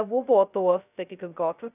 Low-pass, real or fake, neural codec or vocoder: 3.6 kHz; fake; codec, 16 kHz, 0.2 kbps, FocalCodec